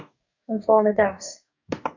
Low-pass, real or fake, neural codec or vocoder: 7.2 kHz; fake; codec, 44.1 kHz, 2.6 kbps, DAC